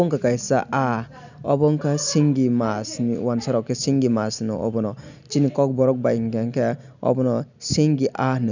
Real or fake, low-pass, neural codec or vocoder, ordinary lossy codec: real; 7.2 kHz; none; none